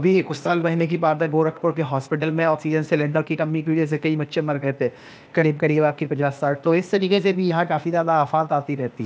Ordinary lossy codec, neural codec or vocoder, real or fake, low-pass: none; codec, 16 kHz, 0.8 kbps, ZipCodec; fake; none